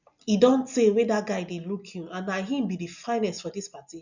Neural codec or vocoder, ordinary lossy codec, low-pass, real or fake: none; none; 7.2 kHz; real